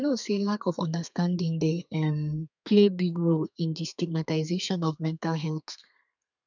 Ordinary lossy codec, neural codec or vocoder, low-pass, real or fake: none; codec, 32 kHz, 1.9 kbps, SNAC; 7.2 kHz; fake